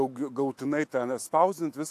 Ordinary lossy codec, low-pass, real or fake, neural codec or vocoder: AAC, 64 kbps; 14.4 kHz; fake; autoencoder, 48 kHz, 128 numbers a frame, DAC-VAE, trained on Japanese speech